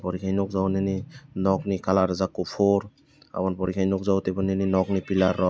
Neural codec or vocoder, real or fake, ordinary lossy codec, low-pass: none; real; none; none